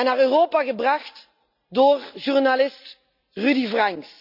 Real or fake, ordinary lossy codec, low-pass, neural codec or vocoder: real; none; 5.4 kHz; none